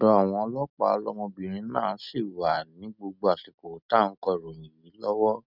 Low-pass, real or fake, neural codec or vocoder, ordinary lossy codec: 5.4 kHz; real; none; none